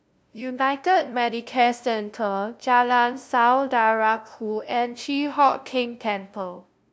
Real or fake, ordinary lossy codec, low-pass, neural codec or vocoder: fake; none; none; codec, 16 kHz, 0.5 kbps, FunCodec, trained on LibriTTS, 25 frames a second